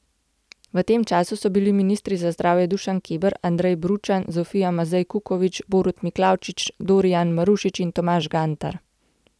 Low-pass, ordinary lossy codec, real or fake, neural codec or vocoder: none; none; real; none